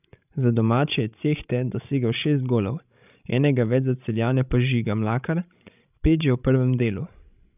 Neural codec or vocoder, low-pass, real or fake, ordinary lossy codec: codec, 16 kHz, 16 kbps, FreqCodec, larger model; 3.6 kHz; fake; none